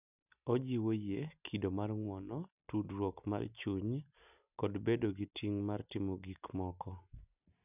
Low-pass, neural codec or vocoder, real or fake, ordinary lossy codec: 3.6 kHz; none; real; none